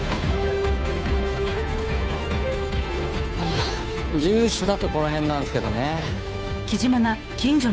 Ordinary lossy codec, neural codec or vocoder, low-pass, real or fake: none; codec, 16 kHz, 2 kbps, FunCodec, trained on Chinese and English, 25 frames a second; none; fake